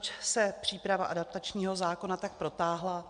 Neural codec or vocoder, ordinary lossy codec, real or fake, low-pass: none; MP3, 64 kbps; real; 9.9 kHz